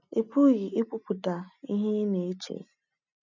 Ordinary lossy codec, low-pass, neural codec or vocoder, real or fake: none; 7.2 kHz; none; real